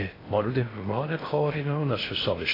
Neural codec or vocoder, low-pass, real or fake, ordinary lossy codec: codec, 16 kHz in and 24 kHz out, 0.6 kbps, FocalCodec, streaming, 2048 codes; 5.4 kHz; fake; AAC, 24 kbps